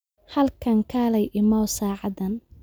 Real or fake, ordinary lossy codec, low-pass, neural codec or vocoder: real; none; none; none